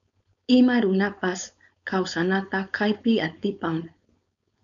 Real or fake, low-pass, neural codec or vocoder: fake; 7.2 kHz; codec, 16 kHz, 4.8 kbps, FACodec